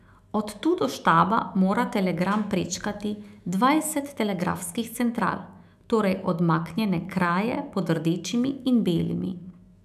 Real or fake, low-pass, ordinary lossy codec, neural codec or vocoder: fake; 14.4 kHz; none; autoencoder, 48 kHz, 128 numbers a frame, DAC-VAE, trained on Japanese speech